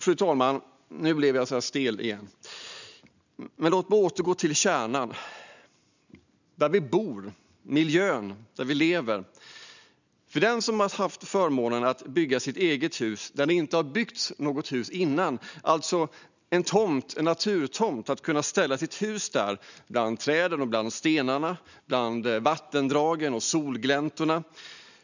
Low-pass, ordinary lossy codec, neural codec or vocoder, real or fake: 7.2 kHz; none; none; real